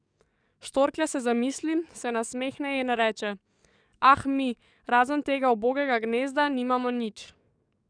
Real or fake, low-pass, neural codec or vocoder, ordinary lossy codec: fake; 9.9 kHz; codec, 44.1 kHz, 7.8 kbps, DAC; none